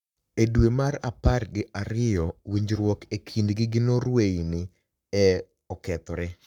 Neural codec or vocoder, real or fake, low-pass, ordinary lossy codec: codec, 44.1 kHz, 7.8 kbps, Pupu-Codec; fake; 19.8 kHz; none